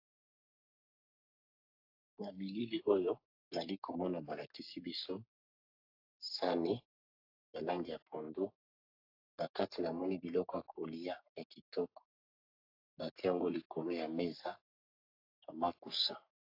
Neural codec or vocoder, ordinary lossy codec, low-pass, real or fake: codec, 44.1 kHz, 3.4 kbps, Pupu-Codec; AAC, 48 kbps; 5.4 kHz; fake